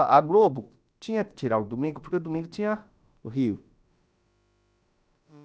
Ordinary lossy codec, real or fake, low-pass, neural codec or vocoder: none; fake; none; codec, 16 kHz, about 1 kbps, DyCAST, with the encoder's durations